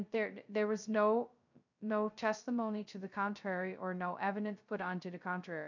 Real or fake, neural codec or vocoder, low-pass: fake; codec, 16 kHz, 0.2 kbps, FocalCodec; 7.2 kHz